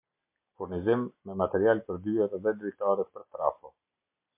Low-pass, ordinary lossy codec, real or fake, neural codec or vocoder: 3.6 kHz; MP3, 32 kbps; real; none